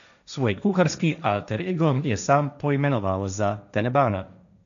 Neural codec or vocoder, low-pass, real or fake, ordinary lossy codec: codec, 16 kHz, 1.1 kbps, Voila-Tokenizer; 7.2 kHz; fake; none